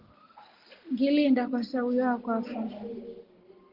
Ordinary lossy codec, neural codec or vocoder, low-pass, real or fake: Opus, 16 kbps; none; 5.4 kHz; real